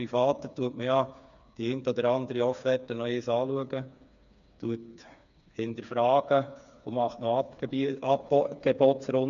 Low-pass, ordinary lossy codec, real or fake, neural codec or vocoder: 7.2 kHz; none; fake; codec, 16 kHz, 4 kbps, FreqCodec, smaller model